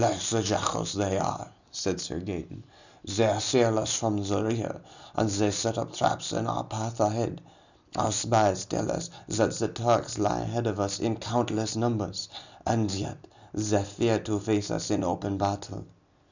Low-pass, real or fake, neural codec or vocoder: 7.2 kHz; real; none